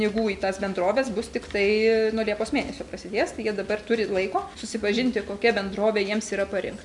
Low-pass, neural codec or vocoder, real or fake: 10.8 kHz; none; real